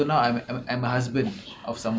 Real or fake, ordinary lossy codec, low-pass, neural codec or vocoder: real; none; none; none